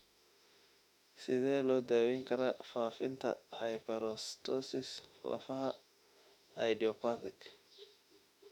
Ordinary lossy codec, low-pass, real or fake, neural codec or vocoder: none; 19.8 kHz; fake; autoencoder, 48 kHz, 32 numbers a frame, DAC-VAE, trained on Japanese speech